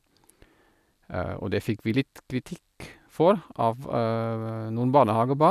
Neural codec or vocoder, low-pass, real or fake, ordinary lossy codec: none; 14.4 kHz; real; none